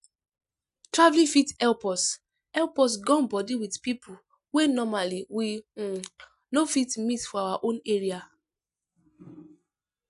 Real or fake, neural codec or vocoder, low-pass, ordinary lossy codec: real; none; 10.8 kHz; none